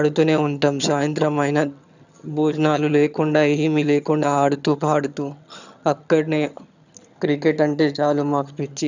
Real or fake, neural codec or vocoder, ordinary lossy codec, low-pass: fake; vocoder, 22.05 kHz, 80 mel bands, HiFi-GAN; none; 7.2 kHz